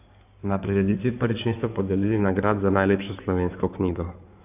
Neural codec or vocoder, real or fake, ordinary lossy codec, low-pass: codec, 16 kHz in and 24 kHz out, 2.2 kbps, FireRedTTS-2 codec; fake; AAC, 32 kbps; 3.6 kHz